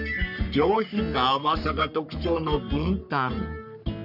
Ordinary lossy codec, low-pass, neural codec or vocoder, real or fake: none; 5.4 kHz; codec, 44.1 kHz, 3.4 kbps, Pupu-Codec; fake